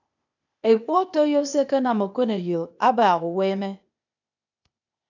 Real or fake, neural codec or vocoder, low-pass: fake; codec, 16 kHz, 0.8 kbps, ZipCodec; 7.2 kHz